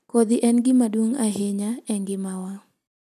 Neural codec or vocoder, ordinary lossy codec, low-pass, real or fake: none; none; 14.4 kHz; real